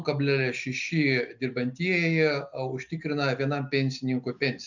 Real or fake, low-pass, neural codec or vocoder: real; 7.2 kHz; none